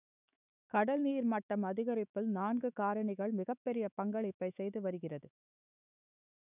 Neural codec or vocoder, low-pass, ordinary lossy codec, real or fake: autoencoder, 48 kHz, 128 numbers a frame, DAC-VAE, trained on Japanese speech; 3.6 kHz; none; fake